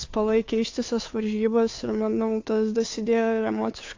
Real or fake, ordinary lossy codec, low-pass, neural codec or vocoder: fake; AAC, 48 kbps; 7.2 kHz; codec, 16 kHz, 2 kbps, FunCodec, trained on Chinese and English, 25 frames a second